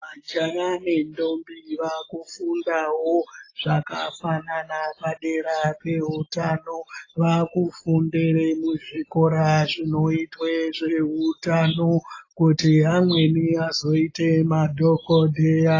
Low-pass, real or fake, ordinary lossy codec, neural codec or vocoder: 7.2 kHz; real; AAC, 32 kbps; none